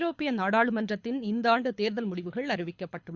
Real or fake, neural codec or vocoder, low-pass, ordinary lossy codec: fake; codec, 24 kHz, 6 kbps, HILCodec; 7.2 kHz; Opus, 64 kbps